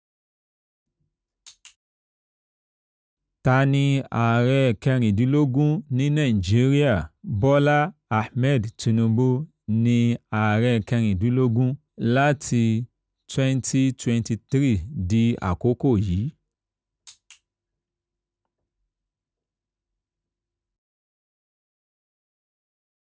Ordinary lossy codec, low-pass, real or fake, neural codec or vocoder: none; none; real; none